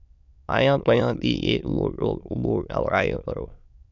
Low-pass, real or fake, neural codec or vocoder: 7.2 kHz; fake; autoencoder, 22.05 kHz, a latent of 192 numbers a frame, VITS, trained on many speakers